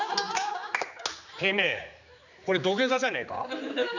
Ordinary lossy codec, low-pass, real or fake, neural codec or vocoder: none; 7.2 kHz; fake; codec, 16 kHz, 4 kbps, X-Codec, HuBERT features, trained on general audio